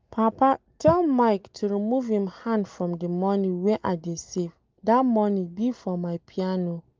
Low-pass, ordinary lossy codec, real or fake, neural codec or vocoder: 7.2 kHz; Opus, 24 kbps; real; none